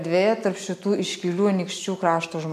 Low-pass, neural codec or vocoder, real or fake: 14.4 kHz; none; real